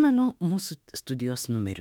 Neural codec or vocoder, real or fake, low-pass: autoencoder, 48 kHz, 32 numbers a frame, DAC-VAE, trained on Japanese speech; fake; 19.8 kHz